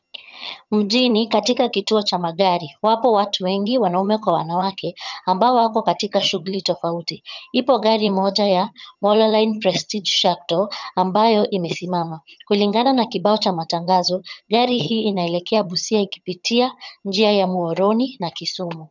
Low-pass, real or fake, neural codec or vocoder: 7.2 kHz; fake; vocoder, 22.05 kHz, 80 mel bands, HiFi-GAN